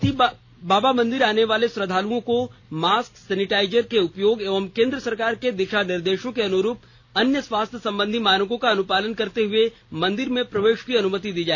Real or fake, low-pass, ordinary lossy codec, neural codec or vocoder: real; none; none; none